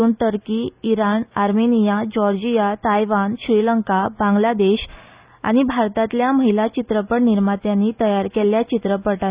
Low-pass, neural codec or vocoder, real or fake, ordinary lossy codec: 3.6 kHz; none; real; Opus, 64 kbps